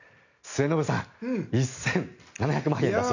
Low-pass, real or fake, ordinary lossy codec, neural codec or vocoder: 7.2 kHz; real; none; none